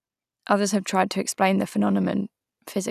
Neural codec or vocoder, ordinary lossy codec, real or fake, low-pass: none; none; real; 14.4 kHz